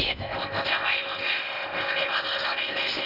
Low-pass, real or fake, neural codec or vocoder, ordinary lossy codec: 5.4 kHz; fake; codec, 16 kHz in and 24 kHz out, 0.8 kbps, FocalCodec, streaming, 65536 codes; none